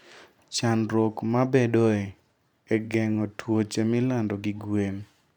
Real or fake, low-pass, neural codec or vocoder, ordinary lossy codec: fake; 19.8 kHz; vocoder, 44.1 kHz, 128 mel bands every 512 samples, BigVGAN v2; none